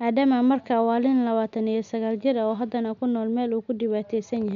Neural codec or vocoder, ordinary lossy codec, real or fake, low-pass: none; none; real; 7.2 kHz